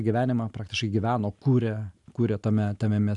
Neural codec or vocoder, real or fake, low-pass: none; real; 10.8 kHz